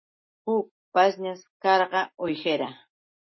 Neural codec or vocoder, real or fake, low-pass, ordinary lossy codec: none; real; 7.2 kHz; MP3, 24 kbps